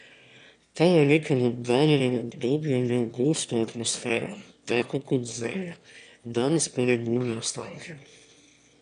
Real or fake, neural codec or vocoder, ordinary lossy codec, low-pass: fake; autoencoder, 22.05 kHz, a latent of 192 numbers a frame, VITS, trained on one speaker; none; 9.9 kHz